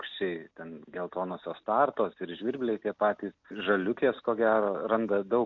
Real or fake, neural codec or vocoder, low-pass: real; none; 7.2 kHz